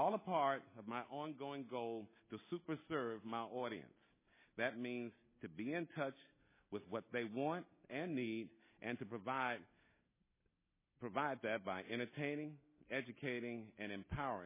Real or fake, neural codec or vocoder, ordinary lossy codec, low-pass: real; none; MP3, 16 kbps; 3.6 kHz